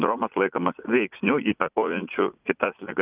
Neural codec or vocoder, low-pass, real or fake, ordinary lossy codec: vocoder, 44.1 kHz, 80 mel bands, Vocos; 3.6 kHz; fake; Opus, 24 kbps